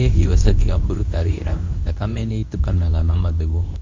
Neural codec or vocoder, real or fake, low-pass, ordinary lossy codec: codec, 24 kHz, 0.9 kbps, WavTokenizer, medium speech release version 2; fake; 7.2 kHz; MP3, 48 kbps